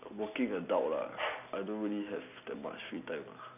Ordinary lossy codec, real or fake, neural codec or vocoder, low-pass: none; real; none; 3.6 kHz